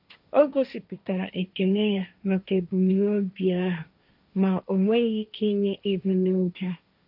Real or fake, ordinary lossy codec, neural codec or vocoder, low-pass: fake; none; codec, 16 kHz, 1.1 kbps, Voila-Tokenizer; 5.4 kHz